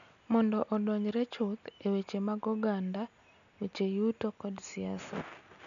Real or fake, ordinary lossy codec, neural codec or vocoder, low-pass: real; none; none; 7.2 kHz